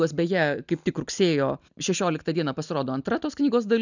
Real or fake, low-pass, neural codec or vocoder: real; 7.2 kHz; none